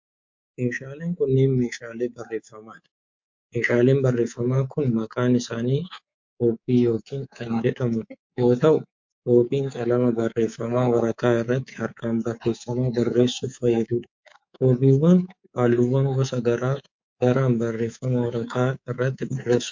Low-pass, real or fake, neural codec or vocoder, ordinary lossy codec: 7.2 kHz; fake; codec, 24 kHz, 3.1 kbps, DualCodec; MP3, 48 kbps